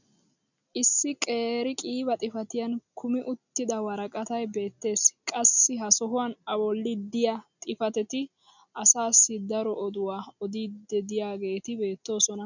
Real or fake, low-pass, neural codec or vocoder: real; 7.2 kHz; none